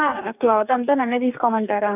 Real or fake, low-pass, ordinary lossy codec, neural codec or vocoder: fake; 3.6 kHz; none; codec, 44.1 kHz, 2.6 kbps, DAC